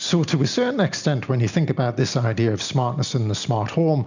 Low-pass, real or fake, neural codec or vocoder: 7.2 kHz; real; none